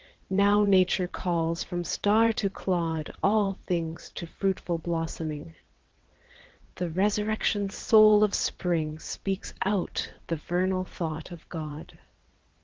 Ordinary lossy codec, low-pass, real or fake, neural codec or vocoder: Opus, 16 kbps; 7.2 kHz; fake; vocoder, 22.05 kHz, 80 mel bands, WaveNeXt